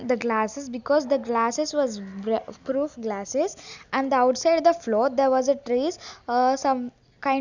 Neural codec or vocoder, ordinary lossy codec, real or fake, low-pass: none; none; real; 7.2 kHz